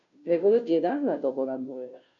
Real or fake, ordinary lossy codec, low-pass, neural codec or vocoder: fake; none; 7.2 kHz; codec, 16 kHz, 0.5 kbps, FunCodec, trained on Chinese and English, 25 frames a second